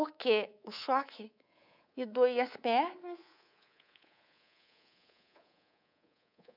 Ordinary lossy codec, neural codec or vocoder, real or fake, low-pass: none; codec, 24 kHz, 3.1 kbps, DualCodec; fake; 5.4 kHz